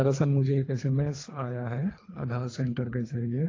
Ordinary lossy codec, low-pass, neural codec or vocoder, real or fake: AAC, 32 kbps; 7.2 kHz; codec, 24 kHz, 3 kbps, HILCodec; fake